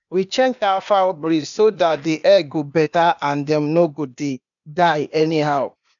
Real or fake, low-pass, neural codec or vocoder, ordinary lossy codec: fake; 7.2 kHz; codec, 16 kHz, 0.8 kbps, ZipCodec; MP3, 96 kbps